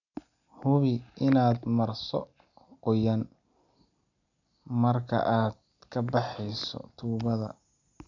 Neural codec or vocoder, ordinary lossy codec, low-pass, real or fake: none; none; 7.2 kHz; real